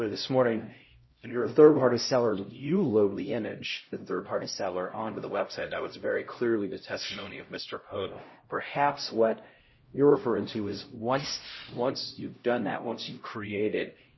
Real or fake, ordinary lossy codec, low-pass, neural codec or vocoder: fake; MP3, 24 kbps; 7.2 kHz; codec, 16 kHz, 0.5 kbps, X-Codec, HuBERT features, trained on LibriSpeech